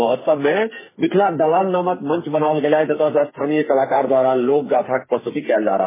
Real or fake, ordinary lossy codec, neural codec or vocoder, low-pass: fake; MP3, 16 kbps; codec, 44.1 kHz, 2.6 kbps, SNAC; 3.6 kHz